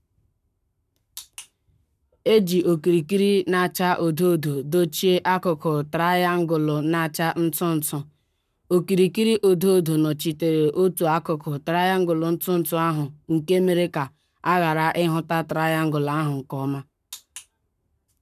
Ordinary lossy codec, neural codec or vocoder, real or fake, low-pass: none; codec, 44.1 kHz, 7.8 kbps, Pupu-Codec; fake; 14.4 kHz